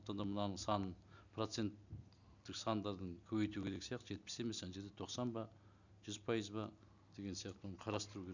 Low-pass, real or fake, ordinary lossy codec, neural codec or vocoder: 7.2 kHz; real; none; none